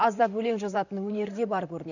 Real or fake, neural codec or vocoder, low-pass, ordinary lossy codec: fake; vocoder, 44.1 kHz, 128 mel bands, Pupu-Vocoder; 7.2 kHz; none